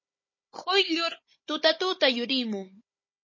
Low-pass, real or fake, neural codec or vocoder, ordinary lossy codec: 7.2 kHz; fake; codec, 16 kHz, 16 kbps, FunCodec, trained on Chinese and English, 50 frames a second; MP3, 32 kbps